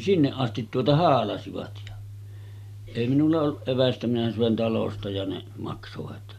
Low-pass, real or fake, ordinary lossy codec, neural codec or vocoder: 14.4 kHz; real; none; none